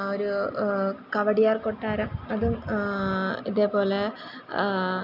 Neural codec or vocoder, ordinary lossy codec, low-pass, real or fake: none; none; 5.4 kHz; real